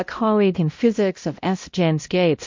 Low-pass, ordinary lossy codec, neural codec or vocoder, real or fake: 7.2 kHz; MP3, 48 kbps; codec, 16 kHz, 0.5 kbps, X-Codec, HuBERT features, trained on balanced general audio; fake